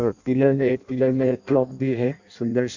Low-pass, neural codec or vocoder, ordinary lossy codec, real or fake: 7.2 kHz; codec, 16 kHz in and 24 kHz out, 0.6 kbps, FireRedTTS-2 codec; none; fake